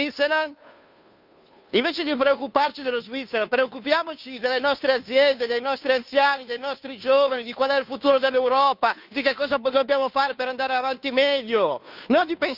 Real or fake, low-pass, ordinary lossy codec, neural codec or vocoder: fake; 5.4 kHz; none; codec, 16 kHz, 2 kbps, FunCodec, trained on Chinese and English, 25 frames a second